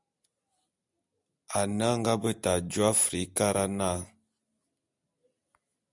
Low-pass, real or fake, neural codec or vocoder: 10.8 kHz; real; none